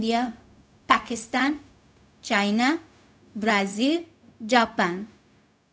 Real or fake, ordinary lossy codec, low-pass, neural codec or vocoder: fake; none; none; codec, 16 kHz, 0.4 kbps, LongCat-Audio-Codec